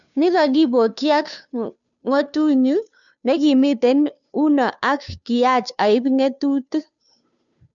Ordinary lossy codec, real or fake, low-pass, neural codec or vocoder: none; fake; 7.2 kHz; codec, 16 kHz, 2 kbps, FunCodec, trained on Chinese and English, 25 frames a second